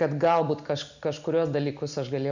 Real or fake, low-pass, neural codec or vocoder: real; 7.2 kHz; none